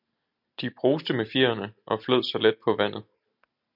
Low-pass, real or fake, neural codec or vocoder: 5.4 kHz; real; none